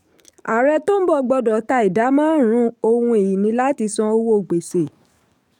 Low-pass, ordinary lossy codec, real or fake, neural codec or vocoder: 19.8 kHz; none; fake; codec, 44.1 kHz, 7.8 kbps, DAC